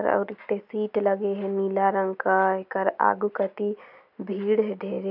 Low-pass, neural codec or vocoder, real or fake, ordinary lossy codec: 5.4 kHz; none; real; none